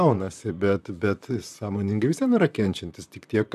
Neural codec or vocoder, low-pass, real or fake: vocoder, 44.1 kHz, 128 mel bands, Pupu-Vocoder; 14.4 kHz; fake